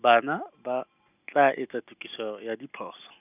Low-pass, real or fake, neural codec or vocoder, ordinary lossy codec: 3.6 kHz; real; none; none